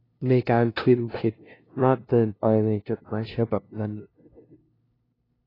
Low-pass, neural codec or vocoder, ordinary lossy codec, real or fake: 5.4 kHz; codec, 16 kHz, 0.5 kbps, FunCodec, trained on LibriTTS, 25 frames a second; AAC, 24 kbps; fake